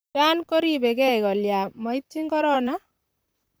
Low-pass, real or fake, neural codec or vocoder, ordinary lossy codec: none; fake; vocoder, 44.1 kHz, 128 mel bands, Pupu-Vocoder; none